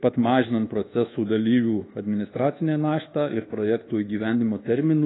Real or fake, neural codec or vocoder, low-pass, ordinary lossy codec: fake; codec, 24 kHz, 1.2 kbps, DualCodec; 7.2 kHz; AAC, 16 kbps